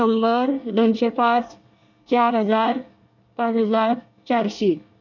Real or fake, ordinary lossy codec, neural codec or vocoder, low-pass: fake; none; codec, 24 kHz, 1 kbps, SNAC; 7.2 kHz